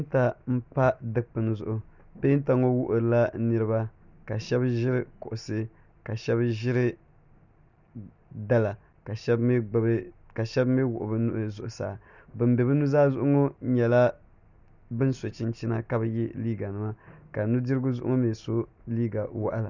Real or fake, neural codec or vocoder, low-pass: real; none; 7.2 kHz